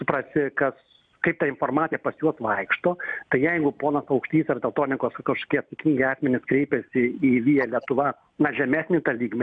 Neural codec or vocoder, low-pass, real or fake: none; 9.9 kHz; real